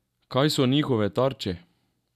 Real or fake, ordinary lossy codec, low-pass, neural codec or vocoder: real; none; 14.4 kHz; none